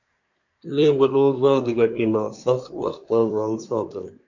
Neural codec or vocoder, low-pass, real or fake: codec, 24 kHz, 1 kbps, SNAC; 7.2 kHz; fake